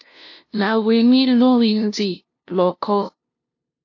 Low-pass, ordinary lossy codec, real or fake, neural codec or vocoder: 7.2 kHz; AAC, 48 kbps; fake; codec, 16 kHz, 0.5 kbps, FunCodec, trained on LibriTTS, 25 frames a second